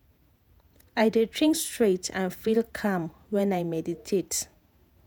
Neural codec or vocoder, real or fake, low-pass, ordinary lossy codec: vocoder, 48 kHz, 128 mel bands, Vocos; fake; none; none